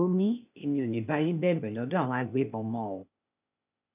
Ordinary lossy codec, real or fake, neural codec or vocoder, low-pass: none; fake; codec, 16 kHz, 0.8 kbps, ZipCodec; 3.6 kHz